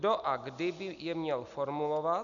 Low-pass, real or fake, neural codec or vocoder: 7.2 kHz; real; none